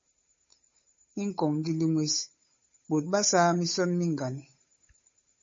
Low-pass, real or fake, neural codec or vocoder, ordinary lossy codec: 7.2 kHz; fake; codec, 16 kHz, 8 kbps, FunCodec, trained on Chinese and English, 25 frames a second; MP3, 32 kbps